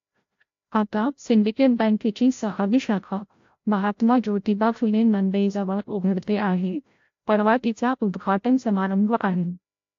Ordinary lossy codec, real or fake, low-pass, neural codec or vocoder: AAC, 48 kbps; fake; 7.2 kHz; codec, 16 kHz, 0.5 kbps, FreqCodec, larger model